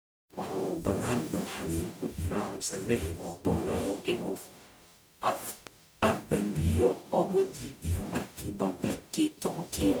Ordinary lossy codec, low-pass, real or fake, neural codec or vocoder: none; none; fake; codec, 44.1 kHz, 0.9 kbps, DAC